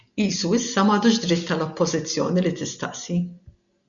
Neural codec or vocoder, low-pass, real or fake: none; 7.2 kHz; real